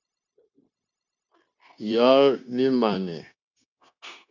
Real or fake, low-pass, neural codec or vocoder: fake; 7.2 kHz; codec, 16 kHz, 0.9 kbps, LongCat-Audio-Codec